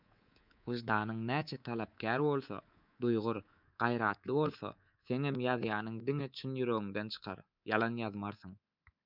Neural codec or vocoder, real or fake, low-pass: codec, 24 kHz, 3.1 kbps, DualCodec; fake; 5.4 kHz